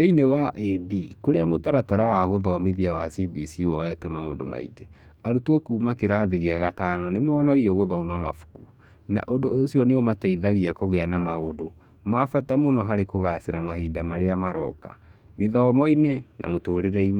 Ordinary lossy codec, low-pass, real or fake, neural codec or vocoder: none; 19.8 kHz; fake; codec, 44.1 kHz, 2.6 kbps, DAC